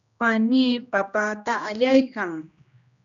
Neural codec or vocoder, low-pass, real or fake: codec, 16 kHz, 1 kbps, X-Codec, HuBERT features, trained on general audio; 7.2 kHz; fake